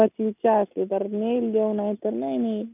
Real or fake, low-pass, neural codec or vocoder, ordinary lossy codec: real; 3.6 kHz; none; none